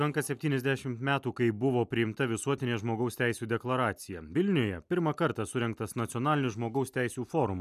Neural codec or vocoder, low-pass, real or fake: none; 14.4 kHz; real